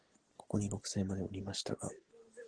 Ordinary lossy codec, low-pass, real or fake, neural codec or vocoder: Opus, 16 kbps; 9.9 kHz; real; none